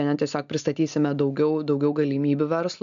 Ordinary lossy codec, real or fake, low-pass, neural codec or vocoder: AAC, 96 kbps; real; 7.2 kHz; none